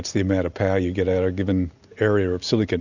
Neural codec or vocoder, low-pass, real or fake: none; 7.2 kHz; real